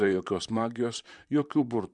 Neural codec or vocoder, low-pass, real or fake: vocoder, 44.1 kHz, 128 mel bands, Pupu-Vocoder; 10.8 kHz; fake